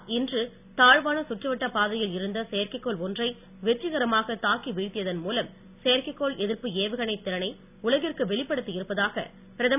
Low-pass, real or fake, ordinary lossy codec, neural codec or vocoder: 3.6 kHz; real; none; none